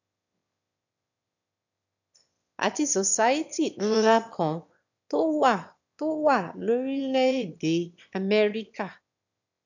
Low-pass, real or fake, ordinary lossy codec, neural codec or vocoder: 7.2 kHz; fake; none; autoencoder, 22.05 kHz, a latent of 192 numbers a frame, VITS, trained on one speaker